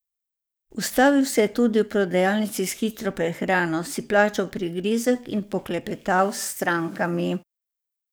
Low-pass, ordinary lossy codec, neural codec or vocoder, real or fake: none; none; codec, 44.1 kHz, 7.8 kbps, DAC; fake